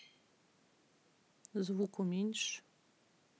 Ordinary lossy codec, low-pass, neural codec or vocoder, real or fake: none; none; none; real